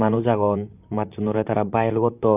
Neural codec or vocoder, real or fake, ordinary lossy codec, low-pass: vocoder, 44.1 kHz, 128 mel bands, Pupu-Vocoder; fake; none; 3.6 kHz